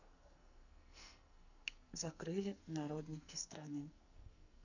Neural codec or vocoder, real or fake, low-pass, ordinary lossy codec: codec, 44.1 kHz, 2.6 kbps, SNAC; fake; 7.2 kHz; none